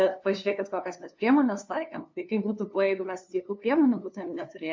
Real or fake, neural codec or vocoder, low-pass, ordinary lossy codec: fake; codec, 16 kHz, 2 kbps, FunCodec, trained on LibriTTS, 25 frames a second; 7.2 kHz; MP3, 48 kbps